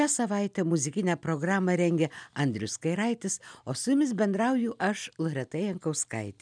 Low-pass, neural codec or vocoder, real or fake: 9.9 kHz; none; real